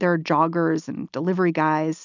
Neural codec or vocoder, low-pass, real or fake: none; 7.2 kHz; real